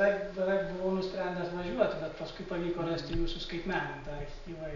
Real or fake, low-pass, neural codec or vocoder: real; 7.2 kHz; none